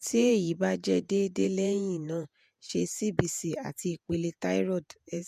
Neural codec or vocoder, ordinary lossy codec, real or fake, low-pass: vocoder, 48 kHz, 128 mel bands, Vocos; MP3, 96 kbps; fake; 14.4 kHz